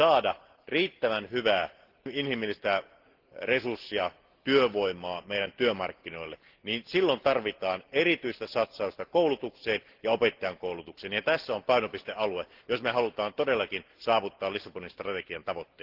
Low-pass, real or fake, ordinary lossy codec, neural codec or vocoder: 5.4 kHz; real; Opus, 16 kbps; none